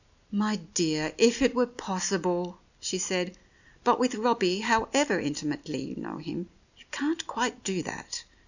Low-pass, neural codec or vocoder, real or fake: 7.2 kHz; none; real